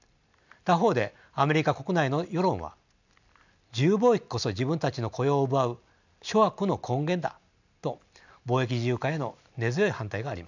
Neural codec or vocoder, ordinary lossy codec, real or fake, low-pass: none; none; real; 7.2 kHz